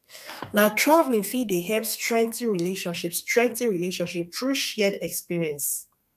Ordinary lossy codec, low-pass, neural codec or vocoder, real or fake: none; 14.4 kHz; codec, 32 kHz, 1.9 kbps, SNAC; fake